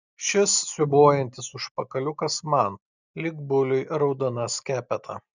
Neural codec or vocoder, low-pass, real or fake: none; 7.2 kHz; real